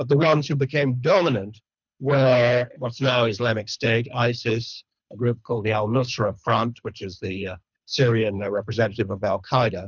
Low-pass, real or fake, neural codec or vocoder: 7.2 kHz; fake; codec, 24 kHz, 3 kbps, HILCodec